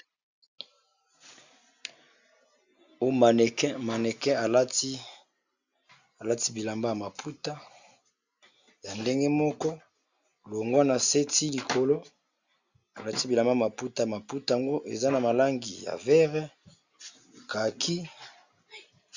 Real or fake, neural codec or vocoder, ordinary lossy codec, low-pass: real; none; Opus, 64 kbps; 7.2 kHz